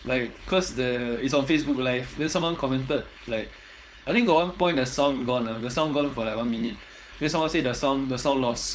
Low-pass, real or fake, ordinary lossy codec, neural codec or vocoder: none; fake; none; codec, 16 kHz, 4.8 kbps, FACodec